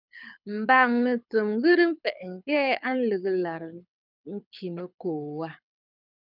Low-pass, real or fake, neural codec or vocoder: 5.4 kHz; fake; codec, 24 kHz, 6 kbps, HILCodec